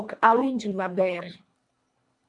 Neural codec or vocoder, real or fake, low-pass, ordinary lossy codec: codec, 24 kHz, 1.5 kbps, HILCodec; fake; 10.8 kHz; MP3, 64 kbps